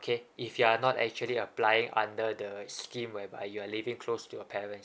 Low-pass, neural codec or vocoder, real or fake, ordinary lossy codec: none; none; real; none